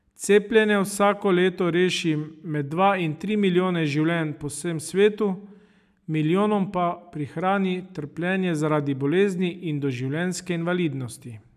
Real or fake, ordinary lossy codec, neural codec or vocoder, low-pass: real; none; none; 14.4 kHz